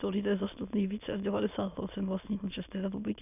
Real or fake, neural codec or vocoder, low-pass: fake; autoencoder, 22.05 kHz, a latent of 192 numbers a frame, VITS, trained on many speakers; 3.6 kHz